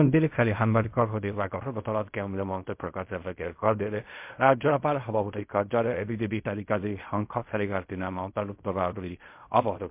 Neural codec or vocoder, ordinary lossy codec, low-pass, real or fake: codec, 16 kHz in and 24 kHz out, 0.4 kbps, LongCat-Audio-Codec, fine tuned four codebook decoder; MP3, 32 kbps; 3.6 kHz; fake